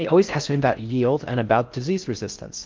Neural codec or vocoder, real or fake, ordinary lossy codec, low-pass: codec, 16 kHz in and 24 kHz out, 0.6 kbps, FocalCodec, streaming, 4096 codes; fake; Opus, 24 kbps; 7.2 kHz